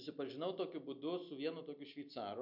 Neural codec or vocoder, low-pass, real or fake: none; 5.4 kHz; real